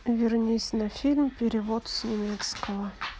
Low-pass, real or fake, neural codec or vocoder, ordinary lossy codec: none; real; none; none